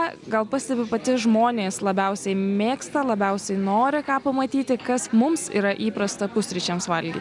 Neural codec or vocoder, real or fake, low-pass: none; real; 10.8 kHz